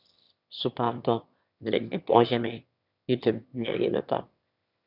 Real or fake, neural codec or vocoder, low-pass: fake; autoencoder, 22.05 kHz, a latent of 192 numbers a frame, VITS, trained on one speaker; 5.4 kHz